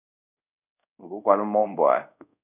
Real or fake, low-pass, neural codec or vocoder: fake; 3.6 kHz; codec, 24 kHz, 0.5 kbps, DualCodec